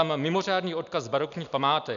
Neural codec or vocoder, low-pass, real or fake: none; 7.2 kHz; real